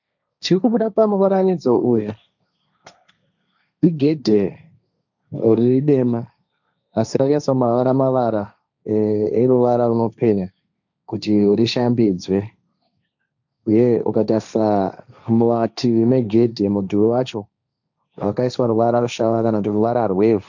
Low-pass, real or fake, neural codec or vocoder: 7.2 kHz; fake; codec, 16 kHz, 1.1 kbps, Voila-Tokenizer